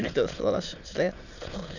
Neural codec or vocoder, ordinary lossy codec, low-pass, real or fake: autoencoder, 22.05 kHz, a latent of 192 numbers a frame, VITS, trained on many speakers; none; 7.2 kHz; fake